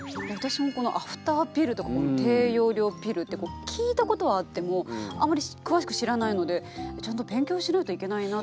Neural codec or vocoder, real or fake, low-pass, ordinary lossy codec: none; real; none; none